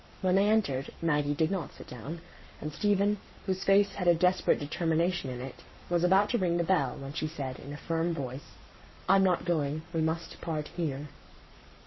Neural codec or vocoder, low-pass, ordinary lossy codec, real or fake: codec, 44.1 kHz, 7.8 kbps, Pupu-Codec; 7.2 kHz; MP3, 24 kbps; fake